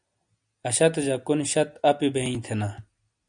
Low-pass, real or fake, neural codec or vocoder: 9.9 kHz; real; none